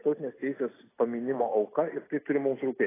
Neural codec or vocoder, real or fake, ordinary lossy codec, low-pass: none; real; AAC, 16 kbps; 3.6 kHz